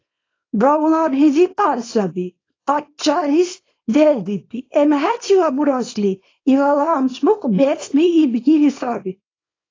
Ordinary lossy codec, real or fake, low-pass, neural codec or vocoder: AAC, 32 kbps; fake; 7.2 kHz; codec, 24 kHz, 0.9 kbps, WavTokenizer, small release